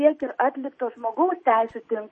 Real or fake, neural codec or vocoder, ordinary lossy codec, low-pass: real; none; MP3, 32 kbps; 10.8 kHz